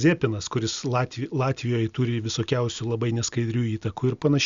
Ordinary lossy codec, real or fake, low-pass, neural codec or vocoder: Opus, 64 kbps; real; 7.2 kHz; none